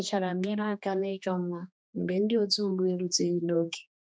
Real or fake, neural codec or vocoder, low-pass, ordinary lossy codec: fake; codec, 16 kHz, 2 kbps, X-Codec, HuBERT features, trained on general audio; none; none